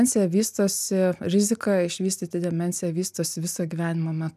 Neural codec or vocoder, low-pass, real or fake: none; 14.4 kHz; real